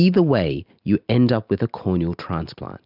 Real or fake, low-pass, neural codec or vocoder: real; 5.4 kHz; none